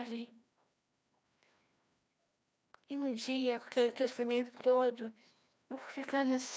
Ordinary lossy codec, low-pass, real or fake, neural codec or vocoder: none; none; fake; codec, 16 kHz, 1 kbps, FreqCodec, larger model